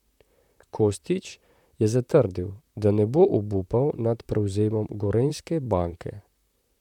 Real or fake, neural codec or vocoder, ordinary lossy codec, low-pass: fake; vocoder, 44.1 kHz, 128 mel bands, Pupu-Vocoder; none; 19.8 kHz